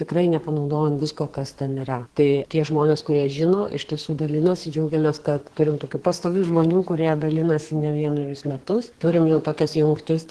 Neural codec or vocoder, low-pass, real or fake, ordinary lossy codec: codec, 32 kHz, 1.9 kbps, SNAC; 10.8 kHz; fake; Opus, 16 kbps